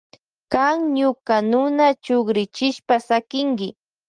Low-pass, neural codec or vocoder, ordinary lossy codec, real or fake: 9.9 kHz; none; Opus, 24 kbps; real